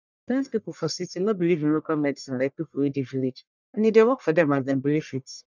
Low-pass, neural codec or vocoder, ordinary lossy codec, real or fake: 7.2 kHz; codec, 44.1 kHz, 1.7 kbps, Pupu-Codec; none; fake